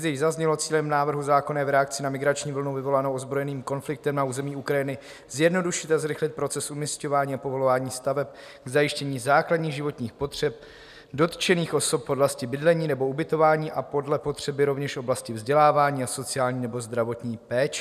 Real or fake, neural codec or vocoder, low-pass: real; none; 14.4 kHz